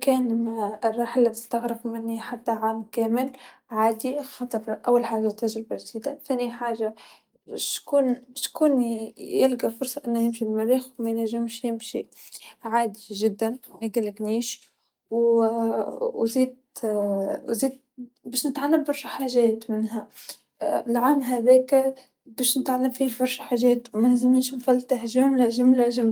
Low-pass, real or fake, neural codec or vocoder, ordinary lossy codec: 19.8 kHz; real; none; Opus, 32 kbps